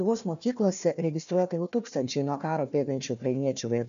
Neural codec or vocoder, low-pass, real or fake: codec, 16 kHz, 1 kbps, FunCodec, trained on Chinese and English, 50 frames a second; 7.2 kHz; fake